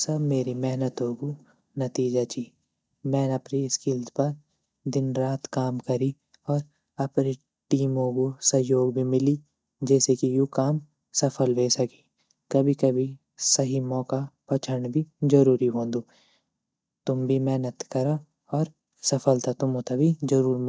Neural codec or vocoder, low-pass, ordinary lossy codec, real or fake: none; none; none; real